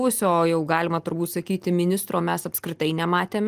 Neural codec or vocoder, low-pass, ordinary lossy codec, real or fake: none; 14.4 kHz; Opus, 24 kbps; real